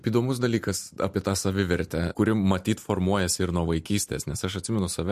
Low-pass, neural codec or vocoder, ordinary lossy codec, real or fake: 14.4 kHz; none; MP3, 64 kbps; real